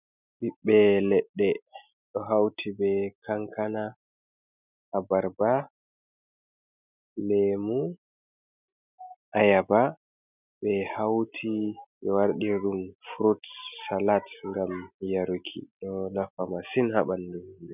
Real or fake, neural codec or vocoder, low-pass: real; none; 3.6 kHz